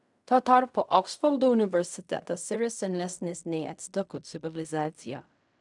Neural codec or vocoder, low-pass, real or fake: codec, 16 kHz in and 24 kHz out, 0.4 kbps, LongCat-Audio-Codec, fine tuned four codebook decoder; 10.8 kHz; fake